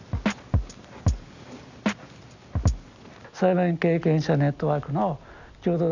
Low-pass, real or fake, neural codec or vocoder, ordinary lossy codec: 7.2 kHz; real; none; none